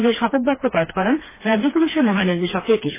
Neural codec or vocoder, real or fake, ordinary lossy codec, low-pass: codec, 16 kHz, 2 kbps, FreqCodec, smaller model; fake; MP3, 16 kbps; 3.6 kHz